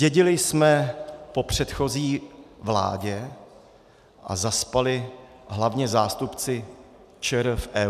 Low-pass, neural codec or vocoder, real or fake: 14.4 kHz; none; real